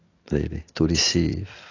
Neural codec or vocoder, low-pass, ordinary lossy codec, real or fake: none; 7.2 kHz; AAC, 32 kbps; real